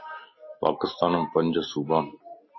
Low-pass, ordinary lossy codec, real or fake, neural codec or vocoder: 7.2 kHz; MP3, 24 kbps; real; none